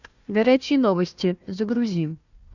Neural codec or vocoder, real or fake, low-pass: codec, 16 kHz, 1 kbps, FunCodec, trained on Chinese and English, 50 frames a second; fake; 7.2 kHz